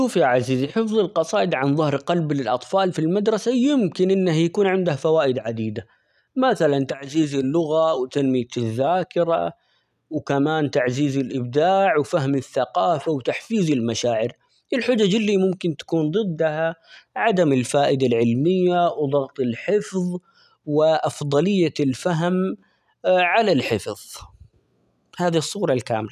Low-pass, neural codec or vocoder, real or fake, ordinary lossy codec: 14.4 kHz; none; real; none